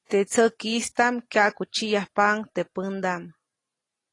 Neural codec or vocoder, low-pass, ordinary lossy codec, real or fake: none; 10.8 kHz; AAC, 32 kbps; real